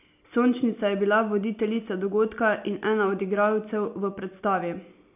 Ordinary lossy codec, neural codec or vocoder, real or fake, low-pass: none; none; real; 3.6 kHz